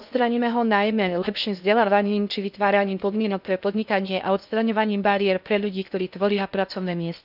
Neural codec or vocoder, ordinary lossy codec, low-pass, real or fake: codec, 16 kHz in and 24 kHz out, 0.6 kbps, FocalCodec, streaming, 2048 codes; none; 5.4 kHz; fake